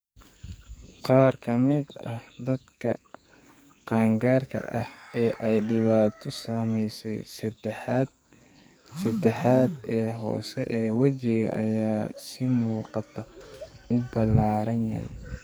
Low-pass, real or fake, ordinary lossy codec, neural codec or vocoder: none; fake; none; codec, 44.1 kHz, 2.6 kbps, SNAC